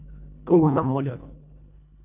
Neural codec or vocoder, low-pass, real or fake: codec, 24 kHz, 1.5 kbps, HILCodec; 3.6 kHz; fake